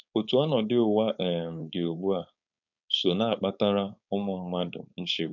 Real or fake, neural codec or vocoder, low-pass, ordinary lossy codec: fake; codec, 16 kHz, 4.8 kbps, FACodec; 7.2 kHz; none